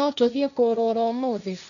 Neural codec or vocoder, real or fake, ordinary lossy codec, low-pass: codec, 16 kHz, 1.1 kbps, Voila-Tokenizer; fake; none; 7.2 kHz